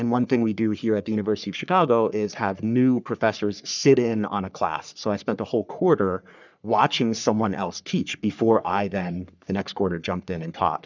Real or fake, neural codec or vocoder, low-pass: fake; codec, 44.1 kHz, 3.4 kbps, Pupu-Codec; 7.2 kHz